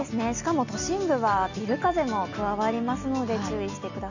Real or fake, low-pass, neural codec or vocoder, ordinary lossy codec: real; 7.2 kHz; none; none